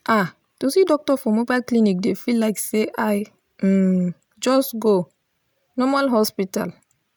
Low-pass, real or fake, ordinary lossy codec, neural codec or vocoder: none; real; none; none